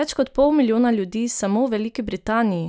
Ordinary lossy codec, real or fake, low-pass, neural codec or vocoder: none; real; none; none